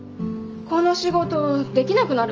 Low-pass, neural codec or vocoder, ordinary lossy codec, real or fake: 7.2 kHz; none; Opus, 24 kbps; real